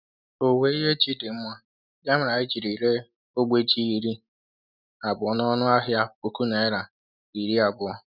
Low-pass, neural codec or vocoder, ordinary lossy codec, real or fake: 5.4 kHz; none; none; real